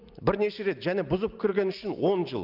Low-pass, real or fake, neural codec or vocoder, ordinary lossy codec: 5.4 kHz; real; none; Opus, 24 kbps